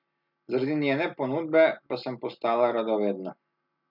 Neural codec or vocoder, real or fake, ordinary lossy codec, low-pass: none; real; none; 5.4 kHz